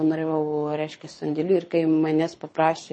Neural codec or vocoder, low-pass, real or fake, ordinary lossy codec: codec, 24 kHz, 6 kbps, HILCodec; 9.9 kHz; fake; MP3, 32 kbps